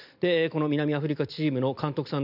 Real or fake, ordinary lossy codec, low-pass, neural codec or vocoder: real; none; 5.4 kHz; none